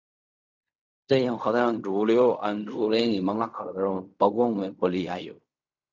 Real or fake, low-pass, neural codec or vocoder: fake; 7.2 kHz; codec, 16 kHz in and 24 kHz out, 0.4 kbps, LongCat-Audio-Codec, fine tuned four codebook decoder